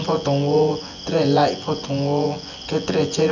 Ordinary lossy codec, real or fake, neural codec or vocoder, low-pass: none; fake; vocoder, 24 kHz, 100 mel bands, Vocos; 7.2 kHz